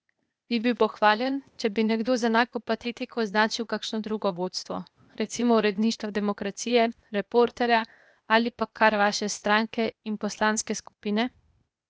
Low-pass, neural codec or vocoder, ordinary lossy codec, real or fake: none; codec, 16 kHz, 0.8 kbps, ZipCodec; none; fake